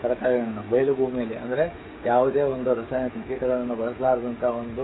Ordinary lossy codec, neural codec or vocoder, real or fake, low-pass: AAC, 16 kbps; codec, 16 kHz, 16 kbps, FreqCodec, smaller model; fake; 7.2 kHz